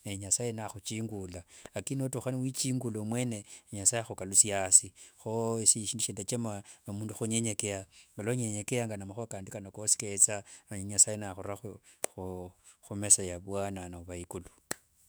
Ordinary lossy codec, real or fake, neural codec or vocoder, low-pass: none; fake; autoencoder, 48 kHz, 128 numbers a frame, DAC-VAE, trained on Japanese speech; none